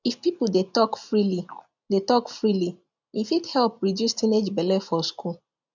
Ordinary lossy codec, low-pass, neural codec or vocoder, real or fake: none; 7.2 kHz; none; real